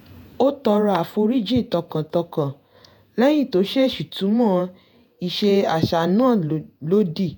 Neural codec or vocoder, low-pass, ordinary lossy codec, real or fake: vocoder, 48 kHz, 128 mel bands, Vocos; none; none; fake